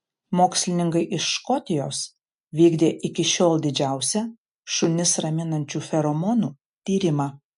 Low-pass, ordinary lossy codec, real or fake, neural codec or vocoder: 10.8 kHz; MP3, 64 kbps; real; none